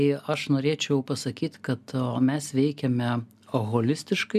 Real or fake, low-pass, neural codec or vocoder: real; 14.4 kHz; none